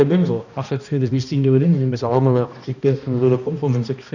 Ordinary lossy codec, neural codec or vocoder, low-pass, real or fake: none; codec, 16 kHz, 1 kbps, X-Codec, HuBERT features, trained on balanced general audio; 7.2 kHz; fake